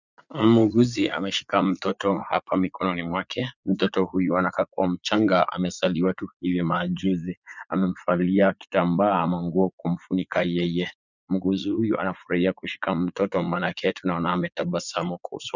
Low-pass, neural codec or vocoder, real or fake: 7.2 kHz; vocoder, 44.1 kHz, 80 mel bands, Vocos; fake